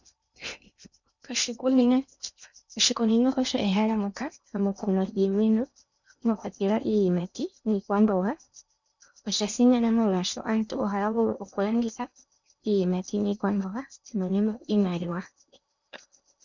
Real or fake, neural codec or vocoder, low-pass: fake; codec, 16 kHz in and 24 kHz out, 0.8 kbps, FocalCodec, streaming, 65536 codes; 7.2 kHz